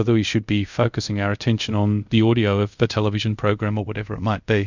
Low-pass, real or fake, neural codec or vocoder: 7.2 kHz; fake; codec, 24 kHz, 0.5 kbps, DualCodec